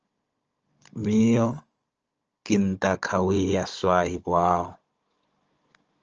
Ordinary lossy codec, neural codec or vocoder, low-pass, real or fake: Opus, 24 kbps; codec, 16 kHz, 16 kbps, FunCodec, trained on Chinese and English, 50 frames a second; 7.2 kHz; fake